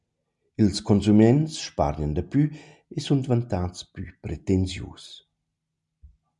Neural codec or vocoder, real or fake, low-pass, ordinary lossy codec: none; real; 10.8 kHz; MP3, 96 kbps